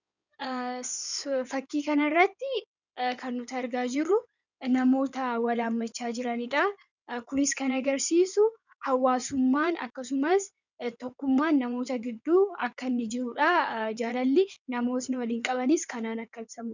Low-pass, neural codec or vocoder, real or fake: 7.2 kHz; codec, 16 kHz in and 24 kHz out, 2.2 kbps, FireRedTTS-2 codec; fake